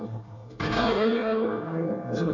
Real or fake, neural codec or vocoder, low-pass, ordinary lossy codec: fake; codec, 24 kHz, 1 kbps, SNAC; 7.2 kHz; none